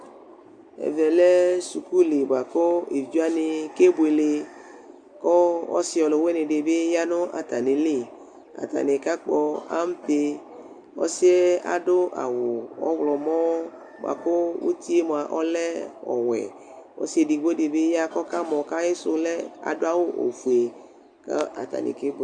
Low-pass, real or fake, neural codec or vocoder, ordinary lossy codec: 9.9 kHz; real; none; Opus, 64 kbps